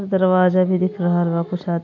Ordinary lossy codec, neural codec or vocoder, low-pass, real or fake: AAC, 48 kbps; none; 7.2 kHz; real